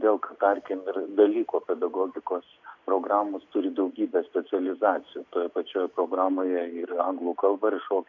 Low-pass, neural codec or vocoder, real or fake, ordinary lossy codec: 7.2 kHz; none; real; AAC, 48 kbps